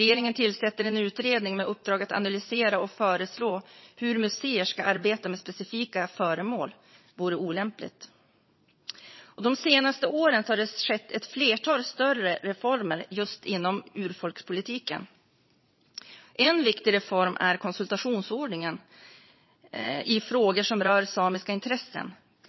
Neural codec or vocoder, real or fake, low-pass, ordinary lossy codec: vocoder, 22.05 kHz, 80 mel bands, Vocos; fake; 7.2 kHz; MP3, 24 kbps